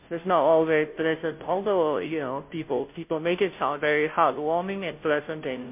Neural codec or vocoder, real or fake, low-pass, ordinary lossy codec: codec, 16 kHz, 0.5 kbps, FunCodec, trained on Chinese and English, 25 frames a second; fake; 3.6 kHz; MP3, 32 kbps